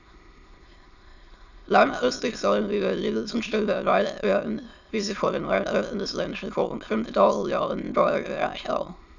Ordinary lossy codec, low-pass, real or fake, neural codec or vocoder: none; 7.2 kHz; fake; autoencoder, 22.05 kHz, a latent of 192 numbers a frame, VITS, trained on many speakers